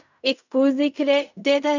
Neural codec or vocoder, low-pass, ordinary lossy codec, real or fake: codec, 16 kHz in and 24 kHz out, 0.4 kbps, LongCat-Audio-Codec, fine tuned four codebook decoder; 7.2 kHz; none; fake